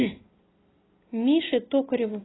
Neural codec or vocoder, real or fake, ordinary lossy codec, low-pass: none; real; AAC, 16 kbps; 7.2 kHz